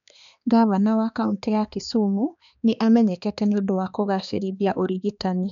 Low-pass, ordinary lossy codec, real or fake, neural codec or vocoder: 7.2 kHz; none; fake; codec, 16 kHz, 2 kbps, X-Codec, HuBERT features, trained on balanced general audio